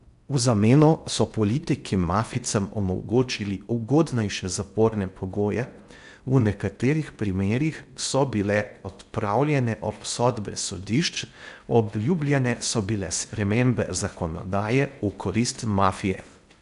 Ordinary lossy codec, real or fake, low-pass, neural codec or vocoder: none; fake; 10.8 kHz; codec, 16 kHz in and 24 kHz out, 0.6 kbps, FocalCodec, streaming, 4096 codes